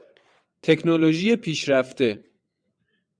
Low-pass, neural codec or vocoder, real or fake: 9.9 kHz; vocoder, 22.05 kHz, 80 mel bands, WaveNeXt; fake